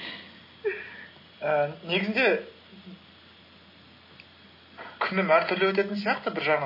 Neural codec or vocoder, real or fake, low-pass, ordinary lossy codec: none; real; 5.4 kHz; MP3, 24 kbps